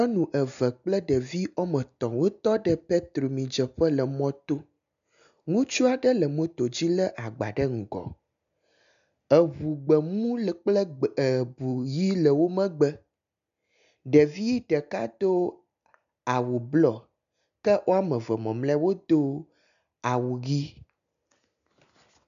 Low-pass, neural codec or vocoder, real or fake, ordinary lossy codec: 7.2 kHz; none; real; MP3, 96 kbps